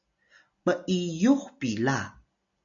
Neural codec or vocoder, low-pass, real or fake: none; 7.2 kHz; real